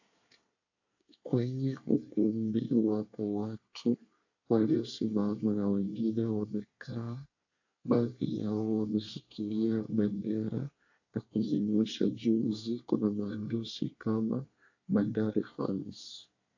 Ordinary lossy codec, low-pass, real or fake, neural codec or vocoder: AAC, 48 kbps; 7.2 kHz; fake; codec, 24 kHz, 1 kbps, SNAC